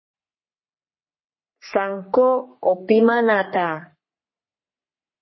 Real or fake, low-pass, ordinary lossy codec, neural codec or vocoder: fake; 7.2 kHz; MP3, 24 kbps; codec, 44.1 kHz, 3.4 kbps, Pupu-Codec